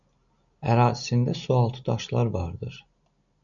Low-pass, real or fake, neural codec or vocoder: 7.2 kHz; real; none